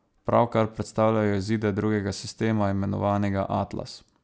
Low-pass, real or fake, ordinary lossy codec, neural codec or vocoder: none; real; none; none